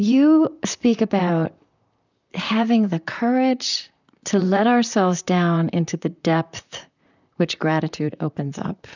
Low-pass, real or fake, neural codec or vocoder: 7.2 kHz; fake; vocoder, 44.1 kHz, 128 mel bands, Pupu-Vocoder